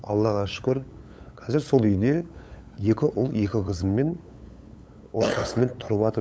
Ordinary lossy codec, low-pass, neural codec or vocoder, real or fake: none; none; codec, 16 kHz, 8 kbps, FunCodec, trained on LibriTTS, 25 frames a second; fake